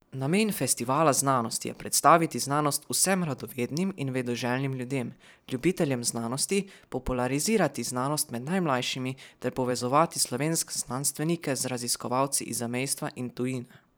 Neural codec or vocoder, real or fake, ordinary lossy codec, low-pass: vocoder, 44.1 kHz, 128 mel bands every 512 samples, BigVGAN v2; fake; none; none